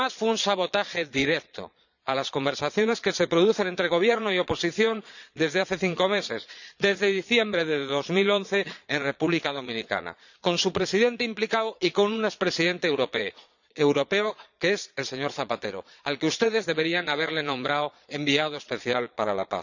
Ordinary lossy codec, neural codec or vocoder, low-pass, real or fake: none; vocoder, 22.05 kHz, 80 mel bands, Vocos; 7.2 kHz; fake